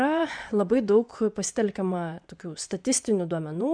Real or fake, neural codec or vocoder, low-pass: fake; vocoder, 24 kHz, 100 mel bands, Vocos; 9.9 kHz